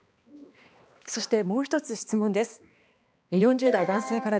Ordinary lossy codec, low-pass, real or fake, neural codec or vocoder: none; none; fake; codec, 16 kHz, 2 kbps, X-Codec, HuBERT features, trained on balanced general audio